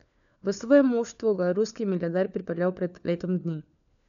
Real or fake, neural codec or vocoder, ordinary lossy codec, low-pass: fake; codec, 16 kHz, 4 kbps, FunCodec, trained on LibriTTS, 50 frames a second; none; 7.2 kHz